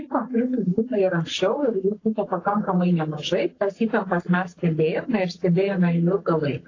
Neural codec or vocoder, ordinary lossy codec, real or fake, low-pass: codec, 44.1 kHz, 7.8 kbps, Pupu-Codec; AAC, 32 kbps; fake; 7.2 kHz